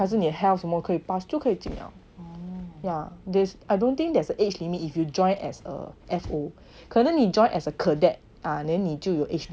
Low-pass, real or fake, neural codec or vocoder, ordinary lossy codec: none; real; none; none